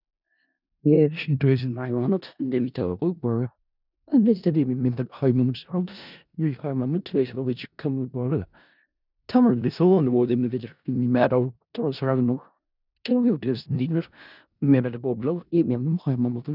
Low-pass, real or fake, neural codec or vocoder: 5.4 kHz; fake; codec, 16 kHz in and 24 kHz out, 0.4 kbps, LongCat-Audio-Codec, four codebook decoder